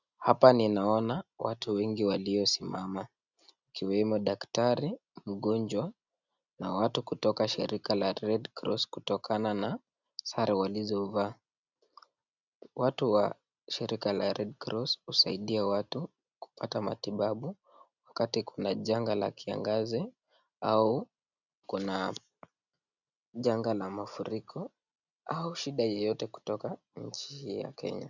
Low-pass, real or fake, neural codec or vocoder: 7.2 kHz; real; none